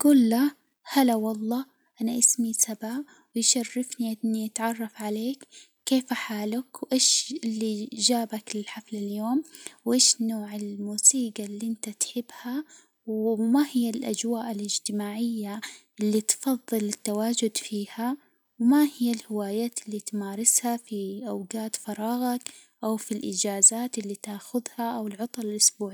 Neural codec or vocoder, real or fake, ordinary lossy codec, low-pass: none; real; none; none